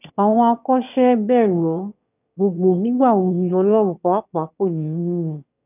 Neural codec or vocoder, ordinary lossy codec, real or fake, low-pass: autoencoder, 22.05 kHz, a latent of 192 numbers a frame, VITS, trained on one speaker; none; fake; 3.6 kHz